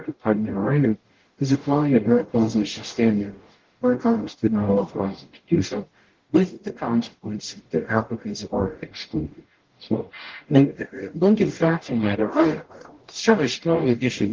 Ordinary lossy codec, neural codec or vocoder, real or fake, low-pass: Opus, 16 kbps; codec, 44.1 kHz, 0.9 kbps, DAC; fake; 7.2 kHz